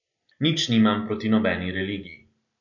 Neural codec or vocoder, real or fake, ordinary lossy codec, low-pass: none; real; none; 7.2 kHz